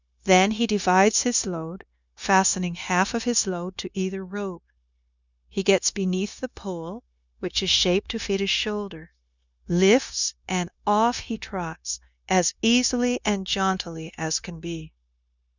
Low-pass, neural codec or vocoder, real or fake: 7.2 kHz; codec, 16 kHz, 0.9 kbps, LongCat-Audio-Codec; fake